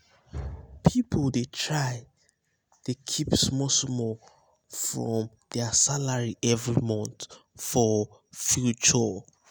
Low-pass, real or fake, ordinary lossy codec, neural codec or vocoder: none; real; none; none